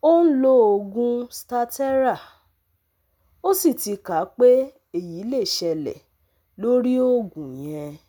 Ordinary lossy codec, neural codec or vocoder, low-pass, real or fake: none; none; 19.8 kHz; real